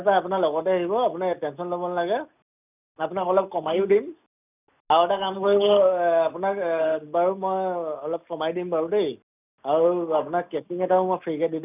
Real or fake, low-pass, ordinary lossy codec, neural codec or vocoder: real; 3.6 kHz; none; none